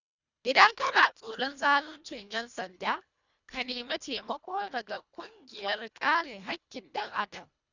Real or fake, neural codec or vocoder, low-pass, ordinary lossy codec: fake; codec, 24 kHz, 1.5 kbps, HILCodec; 7.2 kHz; none